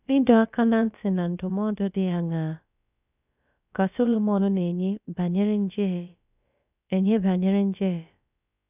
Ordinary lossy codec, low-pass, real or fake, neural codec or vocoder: none; 3.6 kHz; fake; codec, 16 kHz, about 1 kbps, DyCAST, with the encoder's durations